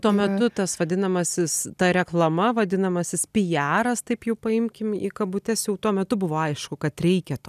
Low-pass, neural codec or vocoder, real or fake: 14.4 kHz; none; real